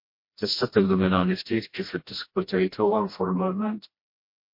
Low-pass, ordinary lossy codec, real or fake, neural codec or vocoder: 5.4 kHz; MP3, 32 kbps; fake; codec, 16 kHz, 1 kbps, FreqCodec, smaller model